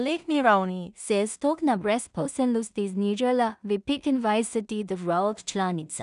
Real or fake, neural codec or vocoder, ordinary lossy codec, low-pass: fake; codec, 16 kHz in and 24 kHz out, 0.4 kbps, LongCat-Audio-Codec, two codebook decoder; none; 10.8 kHz